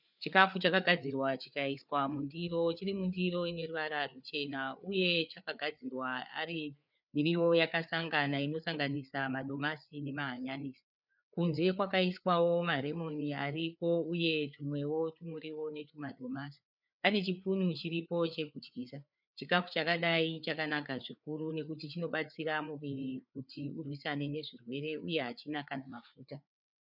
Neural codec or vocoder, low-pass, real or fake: codec, 16 kHz, 4 kbps, FreqCodec, larger model; 5.4 kHz; fake